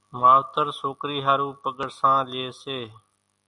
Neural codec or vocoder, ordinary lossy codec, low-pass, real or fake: none; Opus, 64 kbps; 10.8 kHz; real